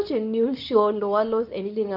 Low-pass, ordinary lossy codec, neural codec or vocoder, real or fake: 5.4 kHz; none; codec, 24 kHz, 0.9 kbps, WavTokenizer, medium speech release version 2; fake